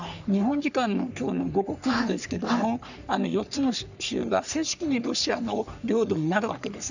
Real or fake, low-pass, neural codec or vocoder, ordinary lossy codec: fake; 7.2 kHz; codec, 44.1 kHz, 3.4 kbps, Pupu-Codec; none